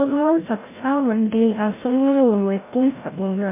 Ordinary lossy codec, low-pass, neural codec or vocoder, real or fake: MP3, 24 kbps; 3.6 kHz; codec, 16 kHz, 0.5 kbps, FreqCodec, larger model; fake